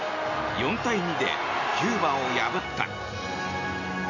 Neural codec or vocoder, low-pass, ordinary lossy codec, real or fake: none; 7.2 kHz; none; real